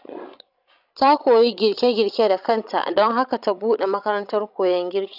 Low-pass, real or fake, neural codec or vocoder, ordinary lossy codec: 5.4 kHz; fake; codec, 16 kHz, 16 kbps, FreqCodec, larger model; none